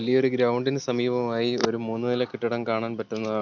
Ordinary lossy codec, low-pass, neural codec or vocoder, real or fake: none; 7.2 kHz; none; real